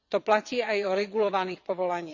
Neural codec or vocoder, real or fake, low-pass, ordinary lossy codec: vocoder, 22.05 kHz, 80 mel bands, WaveNeXt; fake; 7.2 kHz; Opus, 64 kbps